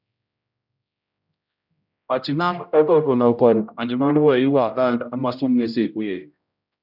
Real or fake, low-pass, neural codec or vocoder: fake; 5.4 kHz; codec, 16 kHz, 0.5 kbps, X-Codec, HuBERT features, trained on general audio